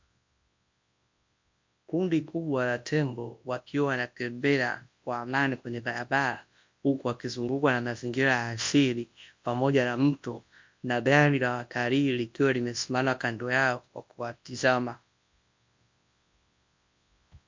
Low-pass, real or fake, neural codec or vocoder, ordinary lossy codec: 7.2 kHz; fake; codec, 24 kHz, 0.9 kbps, WavTokenizer, large speech release; MP3, 48 kbps